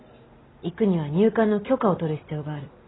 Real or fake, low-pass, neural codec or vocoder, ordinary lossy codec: real; 7.2 kHz; none; AAC, 16 kbps